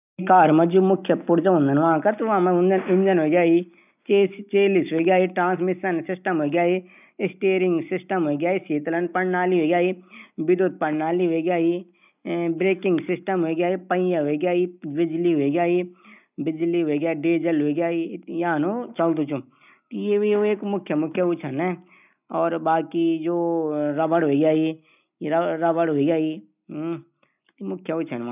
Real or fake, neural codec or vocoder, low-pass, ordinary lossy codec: real; none; 3.6 kHz; none